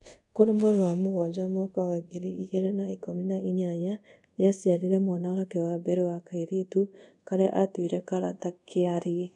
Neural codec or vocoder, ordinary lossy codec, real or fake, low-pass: codec, 24 kHz, 0.5 kbps, DualCodec; none; fake; 10.8 kHz